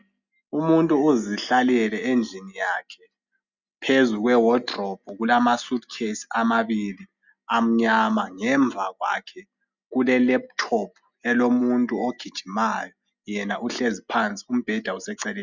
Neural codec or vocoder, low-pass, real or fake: none; 7.2 kHz; real